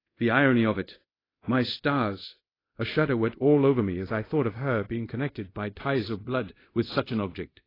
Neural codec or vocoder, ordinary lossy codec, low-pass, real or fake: codec, 24 kHz, 0.5 kbps, DualCodec; AAC, 24 kbps; 5.4 kHz; fake